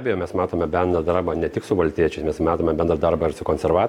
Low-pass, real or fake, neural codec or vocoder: 19.8 kHz; real; none